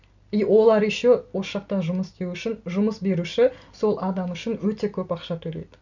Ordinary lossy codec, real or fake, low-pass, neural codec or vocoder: none; real; 7.2 kHz; none